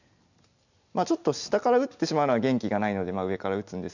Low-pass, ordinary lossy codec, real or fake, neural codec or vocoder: 7.2 kHz; none; real; none